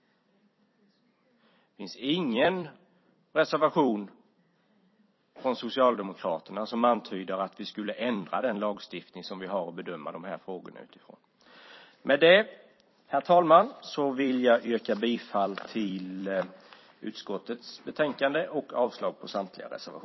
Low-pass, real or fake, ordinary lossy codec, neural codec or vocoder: 7.2 kHz; fake; MP3, 24 kbps; vocoder, 44.1 kHz, 128 mel bands every 256 samples, BigVGAN v2